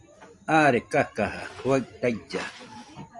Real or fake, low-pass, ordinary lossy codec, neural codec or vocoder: fake; 10.8 kHz; MP3, 96 kbps; vocoder, 44.1 kHz, 128 mel bands every 256 samples, BigVGAN v2